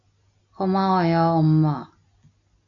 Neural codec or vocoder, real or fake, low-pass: none; real; 7.2 kHz